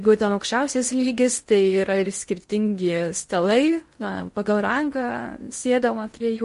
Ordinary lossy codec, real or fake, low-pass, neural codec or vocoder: MP3, 48 kbps; fake; 10.8 kHz; codec, 16 kHz in and 24 kHz out, 0.6 kbps, FocalCodec, streaming, 2048 codes